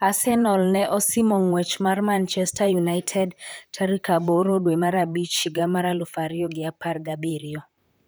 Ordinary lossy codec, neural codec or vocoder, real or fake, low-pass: none; vocoder, 44.1 kHz, 128 mel bands, Pupu-Vocoder; fake; none